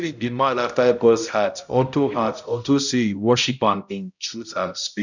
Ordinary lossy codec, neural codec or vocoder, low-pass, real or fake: none; codec, 16 kHz, 0.5 kbps, X-Codec, HuBERT features, trained on balanced general audio; 7.2 kHz; fake